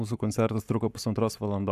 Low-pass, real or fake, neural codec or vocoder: 14.4 kHz; fake; codec, 44.1 kHz, 7.8 kbps, Pupu-Codec